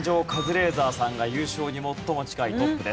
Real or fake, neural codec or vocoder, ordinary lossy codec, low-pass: real; none; none; none